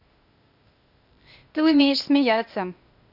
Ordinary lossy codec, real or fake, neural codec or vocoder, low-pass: none; fake; codec, 16 kHz, 0.8 kbps, ZipCodec; 5.4 kHz